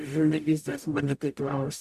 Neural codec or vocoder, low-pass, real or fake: codec, 44.1 kHz, 0.9 kbps, DAC; 14.4 kHz; fake